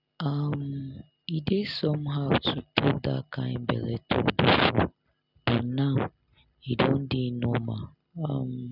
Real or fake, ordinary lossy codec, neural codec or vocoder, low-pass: real; none; none; 5.4 kHz